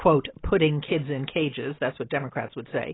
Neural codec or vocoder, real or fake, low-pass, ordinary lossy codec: none; real; 7.2 kHz; AAC, 16 kbps